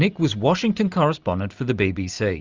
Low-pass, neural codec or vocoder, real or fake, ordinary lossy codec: 7.2 kHz; none; real; Opus, 32 kbps